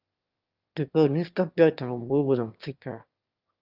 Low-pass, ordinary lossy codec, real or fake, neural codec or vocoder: 5.4 kHz; Opus, 24 kbps; fake; autoencoder, 22.05 kHz, a latent of 192 numbers a frame, VITS, trained on one speaker